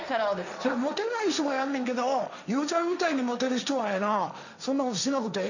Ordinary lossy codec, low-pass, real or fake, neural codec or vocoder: none; 7.2 kHz; fake; codec, 16 kHz, 1.1 kbps, Voila-Tokenizer